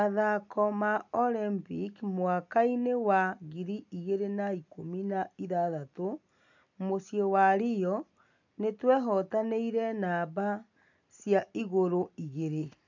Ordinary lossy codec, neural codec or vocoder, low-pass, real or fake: none; none; 7.2 kHz; real